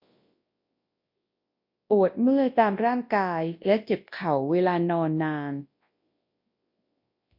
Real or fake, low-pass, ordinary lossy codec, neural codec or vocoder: fake; 5.4 kHz; MP3, 32 kbps; codec, 24 kHz, 0.9 kbps, WavTokenizer, large speech release